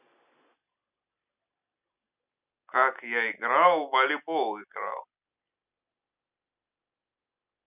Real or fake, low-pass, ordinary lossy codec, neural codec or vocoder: real; 3.6 kHz; none; none